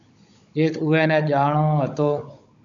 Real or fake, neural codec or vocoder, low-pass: fake; codec, 16 kHz, 16 kbps, FunCodec, trained on Chinese and English, 50 frames a second; 7.2 kHz